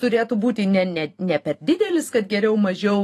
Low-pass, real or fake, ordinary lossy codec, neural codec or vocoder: 14.4 kHz; fake; AAC, 48 kbps; vocoder, 44.1 kHz, 128 mel bands every 512 samples, BigVGAN v2